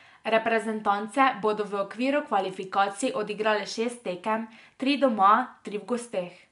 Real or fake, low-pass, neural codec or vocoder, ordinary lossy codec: real; 10.8 kHz; none; MP3, 64 kbps